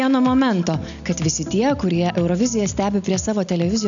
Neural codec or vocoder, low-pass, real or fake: none; 7.2 kHz; real